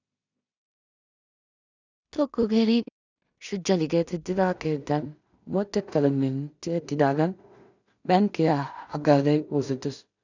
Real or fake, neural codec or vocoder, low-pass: fake; codec, 16 kHz in and 24 kHz out, 0.4 kbps, LongCat-Audio-Codec, two codebook decoder; 7.2 kHz